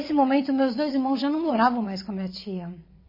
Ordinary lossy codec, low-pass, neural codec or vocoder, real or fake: MP3, 24 kbps; 5.4 kHz; vocoder, 22.05 kHz, 80 mel bands, WaveNeXt; fake